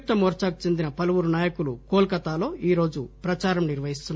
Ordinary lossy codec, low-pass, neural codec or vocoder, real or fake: none; none; none; real